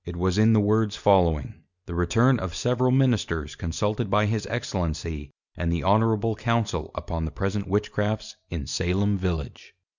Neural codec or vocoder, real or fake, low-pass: none; real; 7.2 kHz